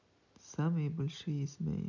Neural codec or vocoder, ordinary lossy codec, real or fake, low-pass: none; none; real; 7.2 kHz